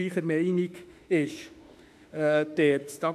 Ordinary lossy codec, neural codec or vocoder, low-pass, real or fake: AAC, 96 kbps; autoencoder, 48 kHz, 32 numbers a frame, DAC-VAE, trained on Japanese speech; 14.4 kHz; fake